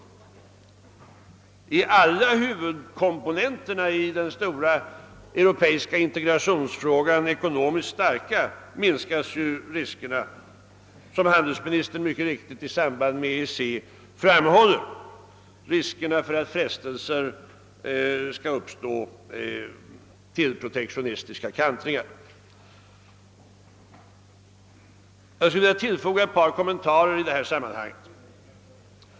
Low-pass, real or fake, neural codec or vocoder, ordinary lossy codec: none; real; none; none